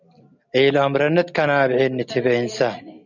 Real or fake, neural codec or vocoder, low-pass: real; none; 7.2 kHz